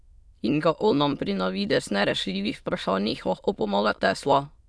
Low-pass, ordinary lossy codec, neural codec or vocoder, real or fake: none; none; autoencoder, 22.05 kHz, a latent of 192 numbers a frame, VITS, trained on many speakers; fake